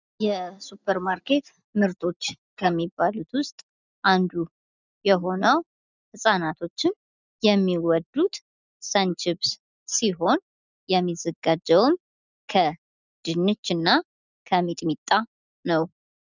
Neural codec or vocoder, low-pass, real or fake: none; 7.2 kHz; real